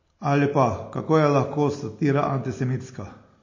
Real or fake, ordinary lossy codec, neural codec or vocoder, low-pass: real; MP3, 32 kbps; none; 7.2 kHz